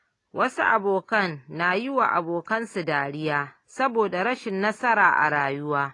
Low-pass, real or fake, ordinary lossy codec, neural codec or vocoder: 10.8 kHz; real; AAC, 32 kbps; none